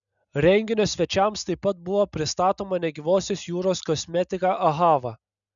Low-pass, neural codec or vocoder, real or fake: 7.2 kHz; none; real